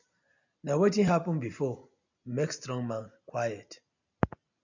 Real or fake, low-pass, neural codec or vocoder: real; 7.2 kHz; none